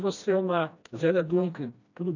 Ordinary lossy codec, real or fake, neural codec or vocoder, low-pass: none; fake; codec, 16 kHz, 1 kbps, FreqCodec, smaller model; 7.2 kHz